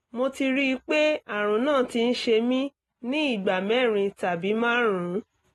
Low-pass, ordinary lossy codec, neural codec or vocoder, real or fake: 19.8 kHz; AAC, 32 kbps; none; real